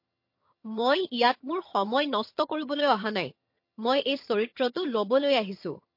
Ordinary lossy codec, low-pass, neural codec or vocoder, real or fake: MP3, 32 kbps; 5.4 kHz; vocoder, 22.05 kHz, 80 mel bands, HiFi-GAN; fake